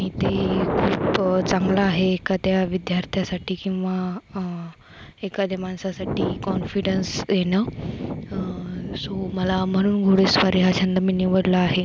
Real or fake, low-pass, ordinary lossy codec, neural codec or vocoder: real; none; none; none